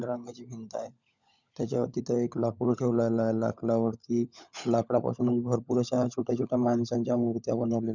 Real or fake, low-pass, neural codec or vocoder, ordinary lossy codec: fake; 7.2 kHz; codec, 16 kHz, 16 kbps, FunCodec, trained on LibriTTS, 50 frames a second; none